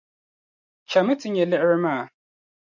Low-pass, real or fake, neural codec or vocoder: 7.2 kHz; real; none